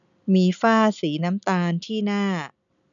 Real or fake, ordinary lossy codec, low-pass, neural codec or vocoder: real; none; 7.2 kHz; none